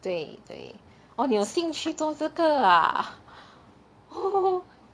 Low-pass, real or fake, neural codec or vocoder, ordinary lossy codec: none; fake; vocoder, 22.05 kHz, 80 mel bands, Vocos; none